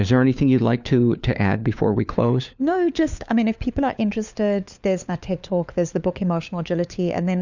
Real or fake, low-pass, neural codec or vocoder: fake; 7.2 kHz; codec, 16 kHz, 4 kbps, FunCodec, trained on LibriTTS, 50 frames a second